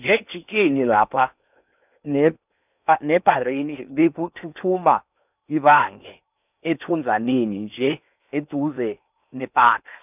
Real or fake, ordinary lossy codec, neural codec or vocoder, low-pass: fake; none; codec, 16 kHz in and 24 kHz out, 0.8 kbps, FocalCodec, streaming, 65536 codes; 3.6 kHz